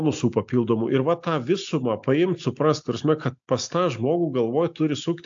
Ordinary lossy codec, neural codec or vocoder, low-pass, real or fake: AAC, 64 kbps; none; 7.2 kHz; real